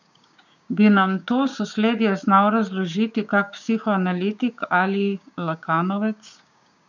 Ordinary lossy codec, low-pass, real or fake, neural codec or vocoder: none; 7.2 kHz; fake; codec, 16 kHz, 6 kbps, DAC